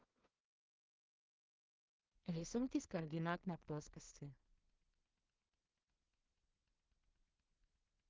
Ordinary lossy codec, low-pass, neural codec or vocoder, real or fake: Opus, 16 kbps; 7.2 kHz; codec, 16 kHz in and 24 kHz out, 0.4 kbps, LongCat-Audio-Codec, two codebook decoder; fake